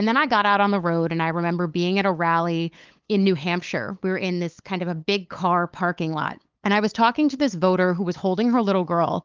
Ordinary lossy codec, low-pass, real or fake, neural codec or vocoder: Opus, 24 kbps; 7.2 kHz; fake; codec, 16 kHz, 8 kbps, FunCodec, trained on LibriTTS, 25 frames a second